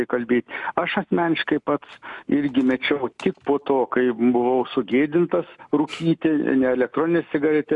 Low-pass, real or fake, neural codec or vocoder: 10.8 kHz; real; none